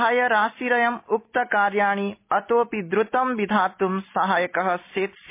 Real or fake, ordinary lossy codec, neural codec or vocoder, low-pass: real; MP3, 24 kbps; none; 3.6 kHz